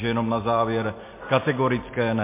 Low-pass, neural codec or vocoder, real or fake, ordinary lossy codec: 3.6 kHz; none; real; MP3, 24 kbps